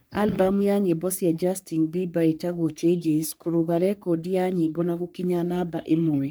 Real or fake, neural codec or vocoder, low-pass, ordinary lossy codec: fake; codec, 44.1 kHz, 3.4 kbps, Pupu-Codec; none; none